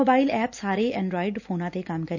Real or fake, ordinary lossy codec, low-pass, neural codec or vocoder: real; none; 7.2 kHz; none